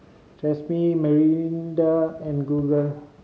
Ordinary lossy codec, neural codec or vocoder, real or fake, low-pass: none; none; real; none